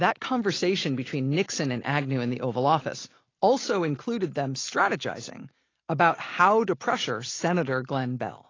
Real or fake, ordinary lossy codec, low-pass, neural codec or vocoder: real; AAC, 32 kbps; 7.2 kHz; none